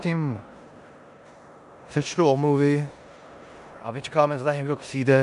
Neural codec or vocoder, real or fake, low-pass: codec, 16 kHz in and 24 kHz out, 0.9 kbps, LongCat-Audio-Codec, four codebook decoder; fake; 10.8 kHz